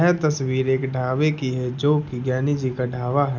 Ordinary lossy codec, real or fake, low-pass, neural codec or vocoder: none; real; 7.2 kHz; none